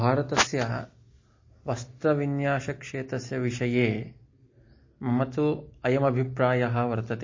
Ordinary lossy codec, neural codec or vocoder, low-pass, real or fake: MP3, 32 kbps; none; 7.2 kHz; real